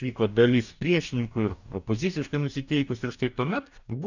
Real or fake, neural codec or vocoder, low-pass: fake; codec, 44.1 kHz, 2.6 kbps, DAC; 7.2 kHz